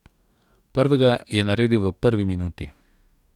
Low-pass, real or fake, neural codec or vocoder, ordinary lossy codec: 19.8 kHz; fake; codec, 44.1 kHz, 2.6 kbps, DAC; none